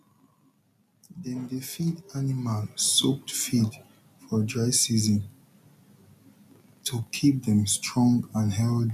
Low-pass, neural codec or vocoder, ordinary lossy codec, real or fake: 14.4 kHz; none; none; real